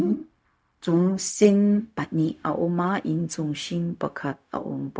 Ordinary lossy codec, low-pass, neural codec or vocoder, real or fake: none; none; codec, 16 kHz, 0.4 kbps, LongCat-Audio-Codec; fake